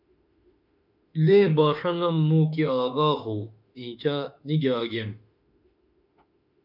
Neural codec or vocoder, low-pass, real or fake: autoencoder, 48 kHz, 32 numbers a frame, DAC-VAE, trained on Japanese speech; 5.4 kHz; fake